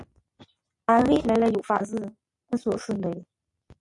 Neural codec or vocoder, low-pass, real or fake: none; 10.8 kHz; real